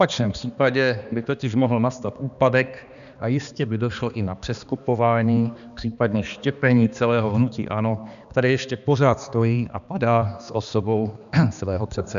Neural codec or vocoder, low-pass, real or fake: codec, 16 kHz, 2 kbps, X-Codec, HuBERT features, trained on balanced general audio; 7.2 kHz; fake